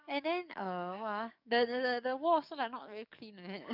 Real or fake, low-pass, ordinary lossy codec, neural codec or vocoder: fake; 5.4 kHz; none; codec, 44.1 kHz, 7.8 kbps, DAC